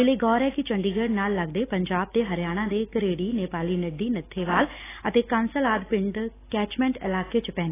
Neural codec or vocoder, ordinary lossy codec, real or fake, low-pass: none; AAC, 16 kbps; real; 3.6 kHz